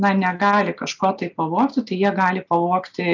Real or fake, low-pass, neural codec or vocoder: real; 7.2 kHz; none